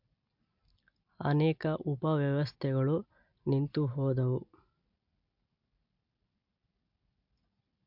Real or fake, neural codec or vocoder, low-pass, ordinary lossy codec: real; none; 5.4 kHz; MP3, 48 kbps